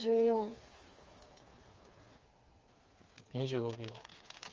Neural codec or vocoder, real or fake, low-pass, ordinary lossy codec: codec, 16 kHz, 4 kbps, FreqCodec, smaller model; fake; 7.2 kHz; Opus, 32 kbps